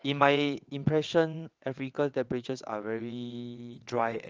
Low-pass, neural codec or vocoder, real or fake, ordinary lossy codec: 7.2 kHz; vocoder, 22.05 kHz, 80 mel bands, WaveNeXt; fake; Opus, 24 kbps